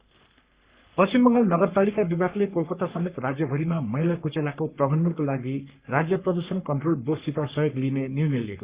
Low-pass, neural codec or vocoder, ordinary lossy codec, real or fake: 3.6 kHz; codec, 44.1 kHz, 3.4 kbps, Pupu-Codec; Opus, 64 kbps; fake